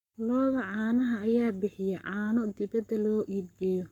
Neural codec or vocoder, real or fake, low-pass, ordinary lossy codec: codec, 44.1 kHz, 7.8 kbps, Pupu-Codec; fake; 19.8 kHz; none